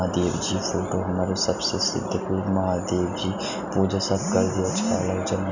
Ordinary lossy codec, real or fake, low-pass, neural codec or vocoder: none; real; 7.2 kHz; none